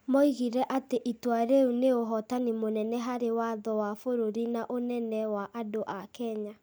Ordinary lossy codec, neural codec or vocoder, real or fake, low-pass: none; none; real; none